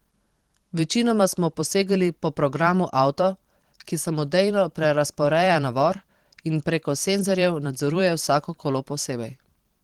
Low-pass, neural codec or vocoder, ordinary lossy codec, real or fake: 19.8 kHz; vocoder, 44.1 kHz, 128 mel bands every 512 samples, BigVGAN v2; Opus, 16 kbps; fake